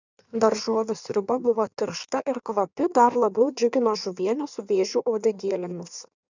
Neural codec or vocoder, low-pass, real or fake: codec, 16 kHz in and 24 kHz out, 1.1 kbps, FireRedTTS-2 codec; 7.2 kHz; fake